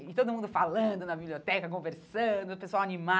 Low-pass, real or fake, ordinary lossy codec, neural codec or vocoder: none; real; none; none